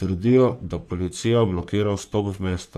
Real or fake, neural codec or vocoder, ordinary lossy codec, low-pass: fake; codec, 44.1 kHz, 3.4 kbps, Pupu-Codec; none; 14.4 kHz